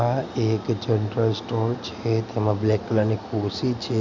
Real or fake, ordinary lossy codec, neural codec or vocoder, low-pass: real; none; none; 7.2 kHz